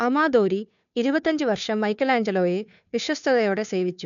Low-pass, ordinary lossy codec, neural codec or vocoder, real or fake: 7.2 kHz; none; codec, 16 kHz, 2 kbps, FunCodec, trained on Chinese and English, 25 frames a second; fake